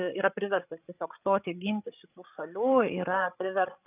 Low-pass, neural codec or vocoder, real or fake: 3.6 kHz; codec, 16 kHz, 16 kbps, FreqCodec, larger model; fake